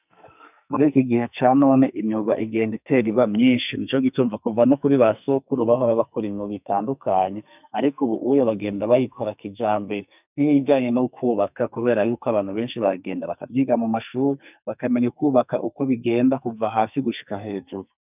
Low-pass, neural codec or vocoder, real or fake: 3.6 kHz; codec, 44.1 kHz, 2.6 kbps, SNAC; fake